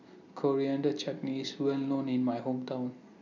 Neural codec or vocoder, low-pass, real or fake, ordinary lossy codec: none; 7.2 kHz; real; none